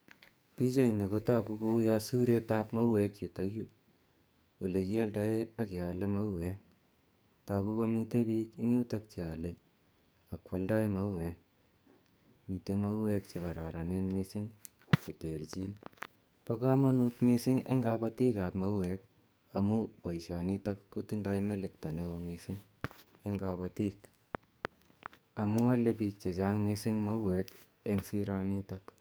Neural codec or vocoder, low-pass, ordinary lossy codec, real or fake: codec, 44.1 kHz, 2.6 kbps, SNAC; none; none; fake